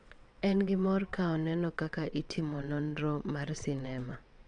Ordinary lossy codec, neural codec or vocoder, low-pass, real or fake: none; vocoder, 22.05 kHz, 80 mel bands, WaveNeXt; 9.9 kHz; fake